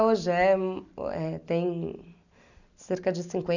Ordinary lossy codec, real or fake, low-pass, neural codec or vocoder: none; real; 7.2 kHz; none